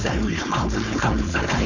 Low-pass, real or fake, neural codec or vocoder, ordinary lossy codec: 7.2 kHz; fake; codec, 16 kHz, 4.8 kbps, FACodec; none